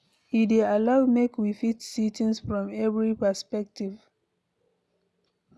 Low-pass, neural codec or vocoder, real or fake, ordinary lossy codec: none; none; real; none